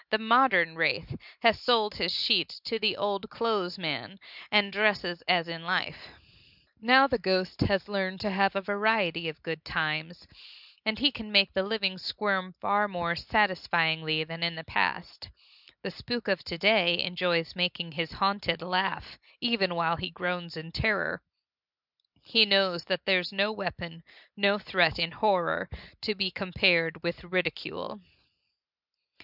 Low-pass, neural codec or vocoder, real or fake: 5.4 kHz; none; real